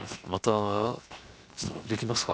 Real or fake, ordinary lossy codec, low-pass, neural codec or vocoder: fake; none; none; codec, 16 kHz, 0.7 kbps, FocalCodec